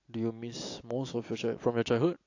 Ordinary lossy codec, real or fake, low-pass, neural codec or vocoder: AAC, 48 kbps; real; 7.2 kHz; none